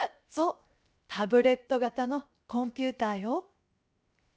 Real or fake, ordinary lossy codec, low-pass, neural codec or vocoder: fake; none; none; codec, 16 kHz, 0.7 kbps, FocalCodec